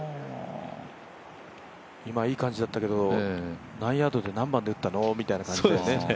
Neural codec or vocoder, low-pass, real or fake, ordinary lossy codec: none; none; real; none